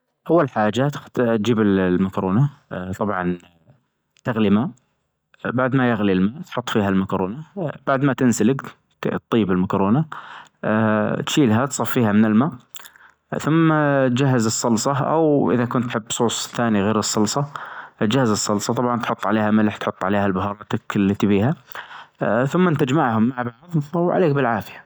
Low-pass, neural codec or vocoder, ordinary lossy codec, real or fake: none; none; none; real